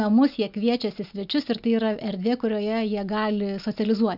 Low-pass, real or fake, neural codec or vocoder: 5.4 kHz; real; none